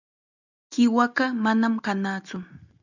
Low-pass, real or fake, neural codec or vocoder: 7.2 kHz; real; none